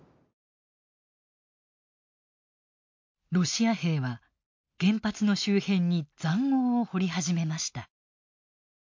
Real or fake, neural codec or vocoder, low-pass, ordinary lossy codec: real; none; 7.2 kHz; MP3, 48 kbps